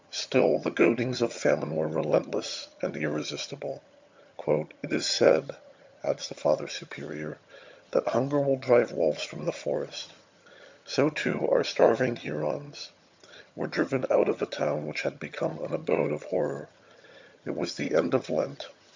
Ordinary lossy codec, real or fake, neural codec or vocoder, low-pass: MP3, 64 kbps; fake; vocoder, 22.05 kHz, 80 mel bands, HiFi-GAN; 7.2 kHz